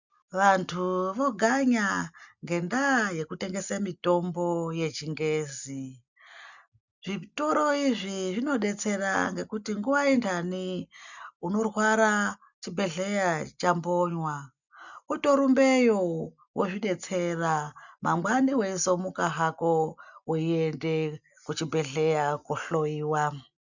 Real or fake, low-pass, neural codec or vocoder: real; 7.2 kHz; none